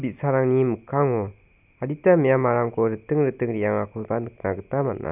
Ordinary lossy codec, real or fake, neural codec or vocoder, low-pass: none; real; none; 3.6 kHz